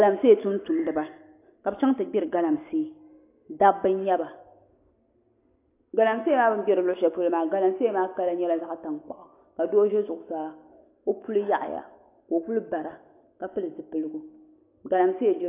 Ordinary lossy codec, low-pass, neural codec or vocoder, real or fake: AAC, 24 kbps; 3.6 kHz; none; real